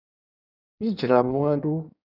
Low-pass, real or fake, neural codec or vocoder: 5.4 kHz; fake; codec, 16 kHz in and 24 kHz out, 1.1 kbps, FireRedTTS-2 codec